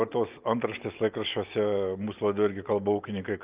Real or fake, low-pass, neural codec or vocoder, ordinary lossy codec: real; 3.6 kHz; none; Opus, 24 kbps